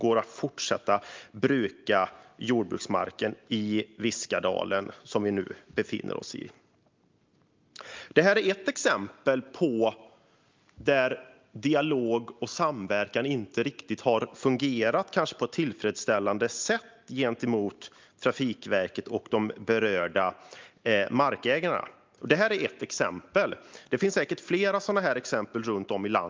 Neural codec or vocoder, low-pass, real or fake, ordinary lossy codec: none; 7.2 kHz; real; Opus, 24 kbps